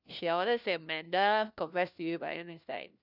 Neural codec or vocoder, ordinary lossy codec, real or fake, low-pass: codec, 16 kHz, 1 kbps, FunCodec, trained on LibriTTS, 50 frames a second; none; fake; 5.4 kHz